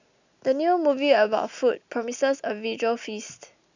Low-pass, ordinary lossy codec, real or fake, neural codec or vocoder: 7.2 kHz; none; real; none